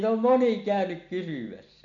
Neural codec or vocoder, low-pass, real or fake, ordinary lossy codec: none; 7.2 kHz; real; none